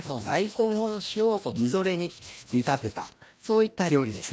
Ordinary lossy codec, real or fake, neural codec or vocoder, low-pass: none; fake; codec, 16 kHz, 1 kbps, FreqCodec, larger model; none